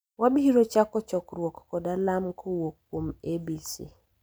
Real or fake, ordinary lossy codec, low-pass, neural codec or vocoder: real; none; none; none